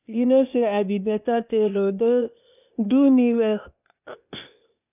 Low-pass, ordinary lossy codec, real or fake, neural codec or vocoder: 3.6 kHz; none; fake; codec, 16 kHz, 0.8 kbps, ZipCodec